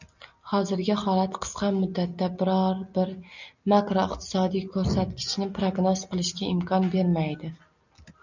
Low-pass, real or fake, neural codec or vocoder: 7.2 kHz; real; none